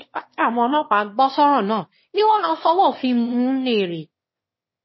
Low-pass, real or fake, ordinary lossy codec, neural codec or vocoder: 7.2 kHz; fake; MP3, 24 kbps; autoencoder, 22.05 kHz, a latent of 192 numbers a frame, VITS, trained on one speaker